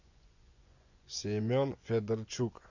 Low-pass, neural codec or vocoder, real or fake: 7.2 kHz; vocoder, 24 kHz, 100 mel bands, Vocos; fake